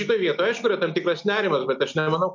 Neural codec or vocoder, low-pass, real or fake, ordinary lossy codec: none; 7.2 kHz; real; MP3, 48 kbps